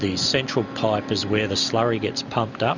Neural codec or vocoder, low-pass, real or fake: none; 7.2 kHz; real